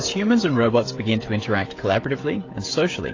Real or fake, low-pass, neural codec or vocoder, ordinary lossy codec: fake; 7.2 kHz; codec, 16 kHz, 16 kbps, FreqCodec, smaller model; AAC, 32 kbps